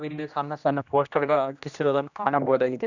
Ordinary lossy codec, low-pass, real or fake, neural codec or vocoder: none; 7.2 kHz; fake; codec, 16 kHz, 1 kbps, X-Codec, HuBERT features, trained on general audio